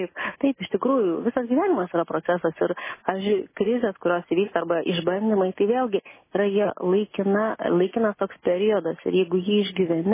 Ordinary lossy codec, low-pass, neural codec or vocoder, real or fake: MP3, 16 kbps; 3.6 kHz; none; real